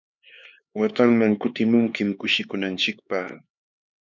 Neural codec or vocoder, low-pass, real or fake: codec, 16 kHz, 4 kbps, X-Codec, HuBERT features, trained on LibriSpeech; 7.2 kHz; fake